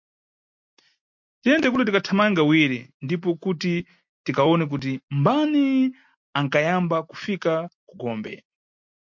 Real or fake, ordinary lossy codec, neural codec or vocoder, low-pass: real; MP3, 48 kbps; none; 7.2 kHz